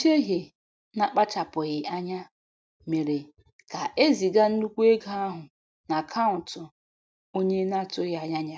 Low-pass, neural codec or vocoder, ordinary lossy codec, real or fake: none; none; none; real